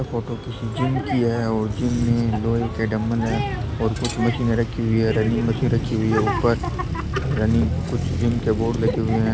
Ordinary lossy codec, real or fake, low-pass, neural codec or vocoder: none; real; none; none